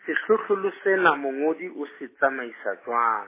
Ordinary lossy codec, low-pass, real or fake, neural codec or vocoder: MP3, 16 kbps; 3.6 kHz; real; none